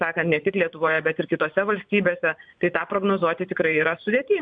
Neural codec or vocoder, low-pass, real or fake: vocoder, 24 kHz, 100 mel bands, Vocos; 9.9 kHz; fake